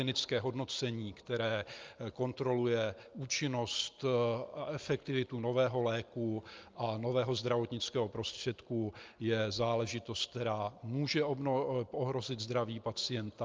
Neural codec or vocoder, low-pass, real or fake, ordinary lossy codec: none; 7.2 kHz; real; Opus, 24 kbps